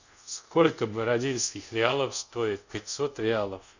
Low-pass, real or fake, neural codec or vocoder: 7.2 kHz; fake; codec, 24 kHz, 0.5 kbps, DualCodec